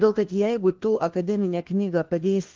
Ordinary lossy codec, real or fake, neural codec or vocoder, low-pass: Opus, 16 kbps; fake; codec, 16 kHz, 1 kbps, FunCodec, trained on LibriTTS, 50 frames a second; 7.2 kHz